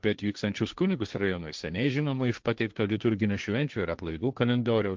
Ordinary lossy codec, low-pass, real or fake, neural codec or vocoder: Opus, 24 kbps; 7.2 kHz; fake; codec, 16 kHz, 1.1 kbps, Voila-Tokenizer